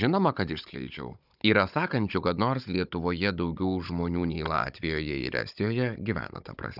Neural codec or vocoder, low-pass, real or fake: codec, 16 kHz, 16 kbps, FunCodec, trained on Chinese and English, 50 frames a second; 5.4 kHz; fake